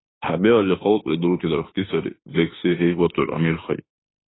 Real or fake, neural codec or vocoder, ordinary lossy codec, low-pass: fake; autoencoder, 48 kHz, 32 numbers a frame, DAC-VAE, trained on Japanese speech; AAC, 16 kbps; 7.2 kHz